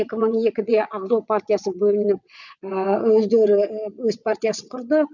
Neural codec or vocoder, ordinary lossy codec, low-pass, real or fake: vocoder, 22.05 kHz, 80 mel bands, WaveNeXt; none; 7.2 kHz; fake